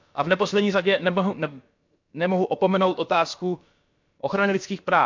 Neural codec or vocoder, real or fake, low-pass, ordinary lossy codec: codec, 16 kHz, about 1 kbps, DyCAST, with the encoder's durations; fake; 7.2 kHz; MP3, 64 kbps